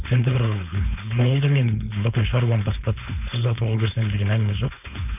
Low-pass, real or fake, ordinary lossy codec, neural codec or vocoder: 3.6 kHz; fake; none; codec, 16 kHz, 4.8 kbps, FACodec